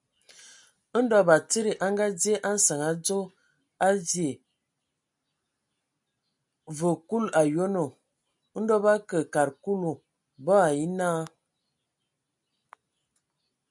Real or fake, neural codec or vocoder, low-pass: real; none; 10.8 kHz